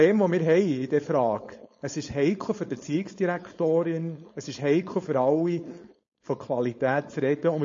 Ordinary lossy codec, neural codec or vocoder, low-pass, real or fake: MP3, 32 kbps; codec, 16 kHz, 4.8 kbps, FACodec; 7.2 kHz; fake